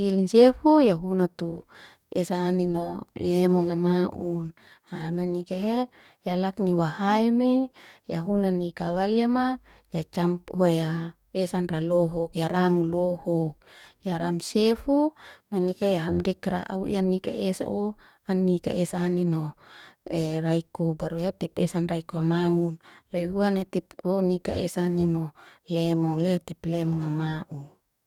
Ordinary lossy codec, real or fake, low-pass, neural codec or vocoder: none; fake; 19.8 kHz; codec, 44.1 kHz, 2.6 kbps, DAC